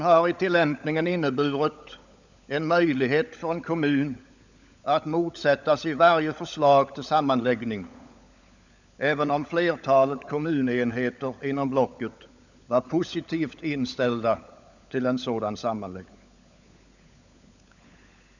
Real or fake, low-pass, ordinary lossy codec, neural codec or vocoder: fake; 7.2 kHz; none; codec, 16 kHz, 16 kbps, FunCodec, trained on LibriTTS, 50 frames a second